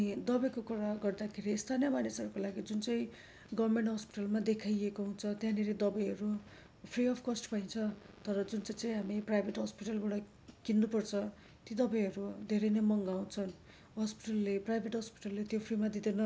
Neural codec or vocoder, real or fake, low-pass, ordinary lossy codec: none; real; none; none